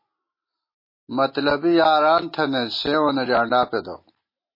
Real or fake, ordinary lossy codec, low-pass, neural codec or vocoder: real; MP3, 24 kbps; 5.4 kHz; none